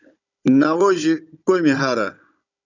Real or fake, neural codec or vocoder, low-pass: fake; codec, 16 kHz, 16 kbps, FunCodec, trained on Chinese and English, 50 frames a second; 7.2 kHz